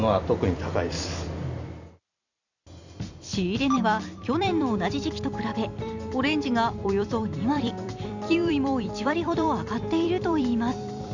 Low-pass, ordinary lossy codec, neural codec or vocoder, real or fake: 7.2 kHz; none; vocoder, 44.1 kHz, 128 mel bands every 256 samples, BigVGAN v2; fake